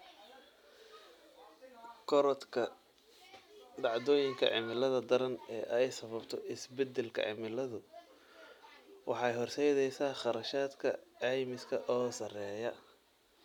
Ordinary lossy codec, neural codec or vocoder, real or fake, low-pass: none; none; real; 19.8 kHz